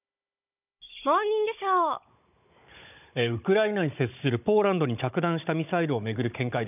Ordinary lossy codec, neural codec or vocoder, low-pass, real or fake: none; codec, 16 kHz, 16 kbps, FunCodec, trained on Chinese and English, 50 frames a second; 3.6 kHz; fake